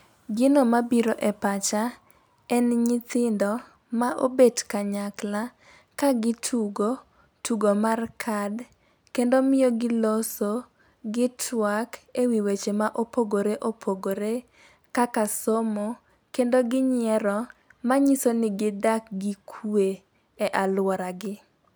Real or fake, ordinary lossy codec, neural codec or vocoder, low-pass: real; none; none; none